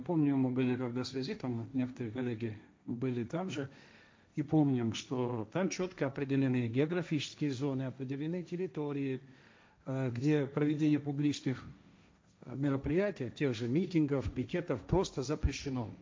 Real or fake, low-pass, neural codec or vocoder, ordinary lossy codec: fake; none; codec, 16 kHz, 1.1 kbps, Voila-Tokenizer; none